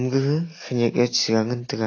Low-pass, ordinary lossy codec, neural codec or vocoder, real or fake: 7.2 kHz; AAC, 32 kbps; none; real